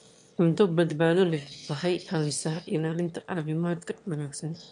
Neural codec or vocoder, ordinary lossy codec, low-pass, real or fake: autoencoder, 22.05 kHz, a latent of 192 numbers a frame, VITS, trained on one speaker; AAC, 64 kbps; 9.9 kHz; fake